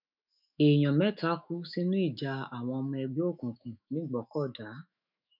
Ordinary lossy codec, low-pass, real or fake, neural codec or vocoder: none; 5.4 kHz; fake; autoencoder, 48 kHz, 128 numbers a frame, DAC-VAE, trained on Japanese speech